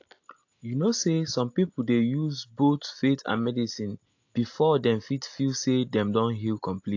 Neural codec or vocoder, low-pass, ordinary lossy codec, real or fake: none; 7.2 kHz; AAC, 48 kbps; real